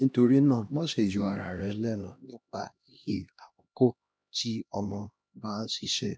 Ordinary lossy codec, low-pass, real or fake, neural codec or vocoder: none; none; fake; codec, 16 kHz, 1 kbps, X-Codec, HuBERT features, trained on LibriSpeech